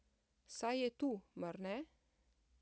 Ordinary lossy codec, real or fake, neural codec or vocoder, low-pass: none; real; none; none